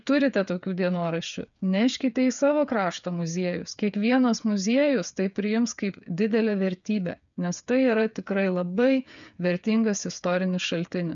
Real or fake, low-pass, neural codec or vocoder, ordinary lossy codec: fake; 7.2 kHz; codec, 16 kHz, 8 kbps, FreqCodec, smaller model; MP3, 96 kbps